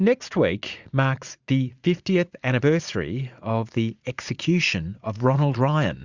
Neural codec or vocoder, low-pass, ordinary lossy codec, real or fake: vocoder, 44.1 kHz, 80 mel bands, Vocos; 7.2 kHz; Opus, 64 kbps; fake